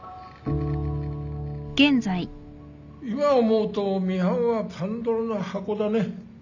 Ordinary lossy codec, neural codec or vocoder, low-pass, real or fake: none; none; 7.2 kHz; real